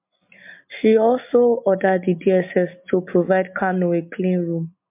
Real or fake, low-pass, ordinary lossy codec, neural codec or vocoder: real; 3.6 kHz; MP3, 32 kbps; none